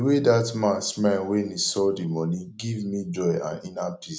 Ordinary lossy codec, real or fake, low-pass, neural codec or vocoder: none; real; none; none